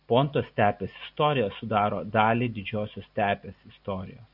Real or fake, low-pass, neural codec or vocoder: real; 5.4 kHz; none